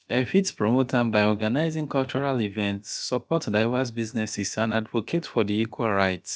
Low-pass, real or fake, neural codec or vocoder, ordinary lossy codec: none; fake; codec, 16 kHz, about 1 kbps, DyCAST, with the encoder's durations; none